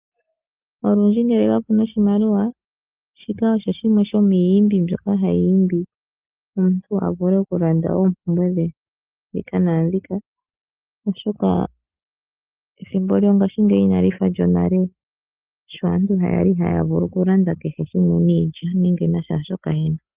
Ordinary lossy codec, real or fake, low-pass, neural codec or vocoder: Opus, 32 kbps; real; 3.6 kHz; none